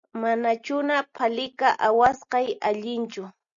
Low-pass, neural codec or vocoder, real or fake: 7.2 kHz; none; real